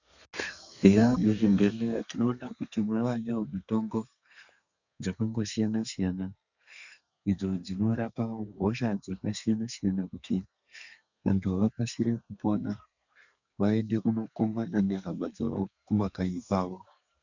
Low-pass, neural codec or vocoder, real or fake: 7.2 kHz; codec, 32 kHz, 1.9 kbps, SNAC; fake